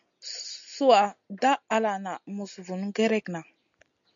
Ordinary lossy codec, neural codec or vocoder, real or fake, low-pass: MP3, 64 kbps; none; real; 7.2 kHz